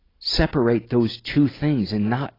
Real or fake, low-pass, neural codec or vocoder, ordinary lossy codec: real; 5.4 kHz; none; AAC, 24 kbps